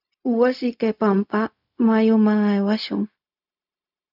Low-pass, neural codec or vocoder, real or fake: 5.4 kHz; codec, 16 kHz, 0.4 kbps, LongCat-Audio-Codec; fake